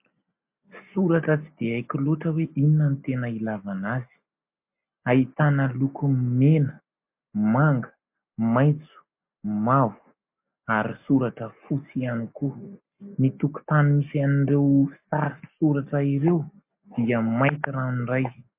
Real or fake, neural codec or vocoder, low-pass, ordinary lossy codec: real; none; 3.6 kHz; MP3, 32 kbps